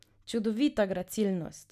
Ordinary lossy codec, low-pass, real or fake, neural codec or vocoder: none; 14.4 kHz; real; none